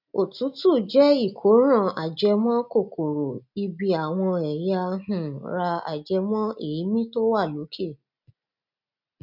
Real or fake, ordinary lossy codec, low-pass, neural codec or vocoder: real; none; 5.4 kHz; none